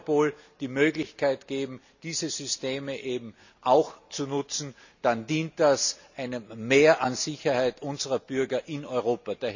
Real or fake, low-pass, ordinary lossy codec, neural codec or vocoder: real; 7.2 kHz; none; none